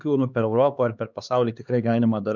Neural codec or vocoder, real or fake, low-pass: codec, 16 kHz, 2 kbps, X-Codec, HuBERT features, trained on LibriSpeech; fake; 7.2 kHz